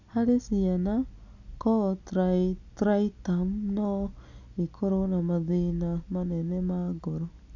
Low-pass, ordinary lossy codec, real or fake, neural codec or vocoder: 7.2 kHz; none; real; none